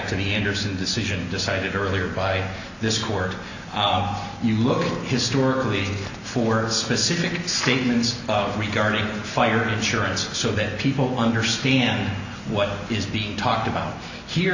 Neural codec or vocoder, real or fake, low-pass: none; real; 7.2 kHz